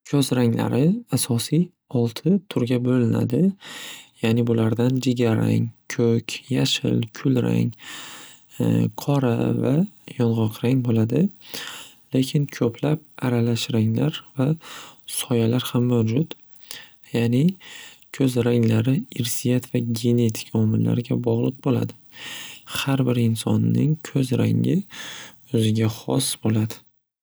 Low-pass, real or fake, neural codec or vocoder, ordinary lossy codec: none; real; none; none